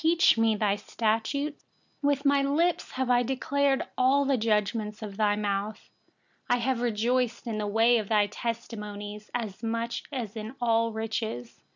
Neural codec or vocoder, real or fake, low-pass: none; real; 7.2 kHz